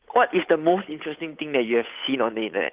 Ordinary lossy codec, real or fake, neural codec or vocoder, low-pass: Opus, 32 kbps; fake; codec, 16 kHz, 8 kbps, FunCodec, trained on Chinese and English, 25 frames a second; 3.6 kHz